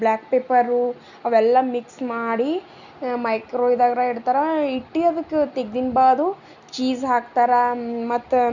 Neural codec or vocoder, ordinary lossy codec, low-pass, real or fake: none; none; 7.2 kHz; real